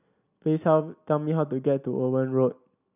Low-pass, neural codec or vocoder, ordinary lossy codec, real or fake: 3.6 kHz; none; none; real